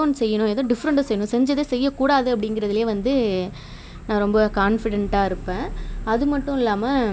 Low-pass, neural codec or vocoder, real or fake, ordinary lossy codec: none; none; real; none